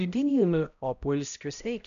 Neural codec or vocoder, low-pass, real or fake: codec, 16 kHz, 0.5 kbps, X-Codec, HuBERT features, trained on balanced general audio; 7.2 kHz; fake